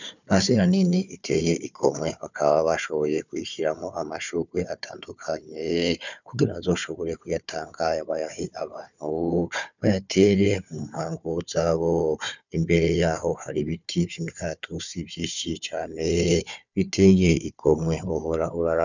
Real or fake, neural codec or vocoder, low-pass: fake; codec, 16 kHz, 4 kbps, FunCodec, trained on Chinese and English, 50 frames a second; 7.2 kHz